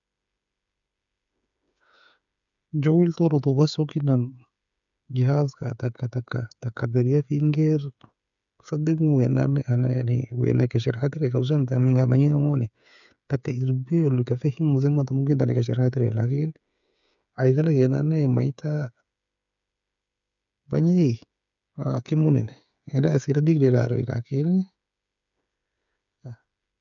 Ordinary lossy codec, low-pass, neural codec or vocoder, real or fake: none; 7.2 kHz; codec, 16 kHz, 8 kbps, FreqCodec, smaller model; fake